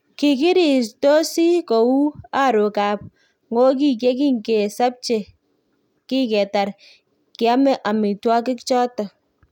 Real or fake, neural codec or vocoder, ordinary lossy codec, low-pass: real; none; none; 19.8 kHz